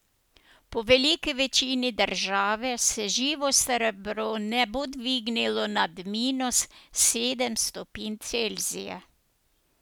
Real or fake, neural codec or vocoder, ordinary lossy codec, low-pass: real; none; none; none